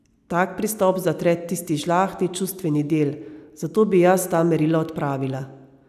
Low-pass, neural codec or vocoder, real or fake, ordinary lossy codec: 14.4 kHz; none; real; none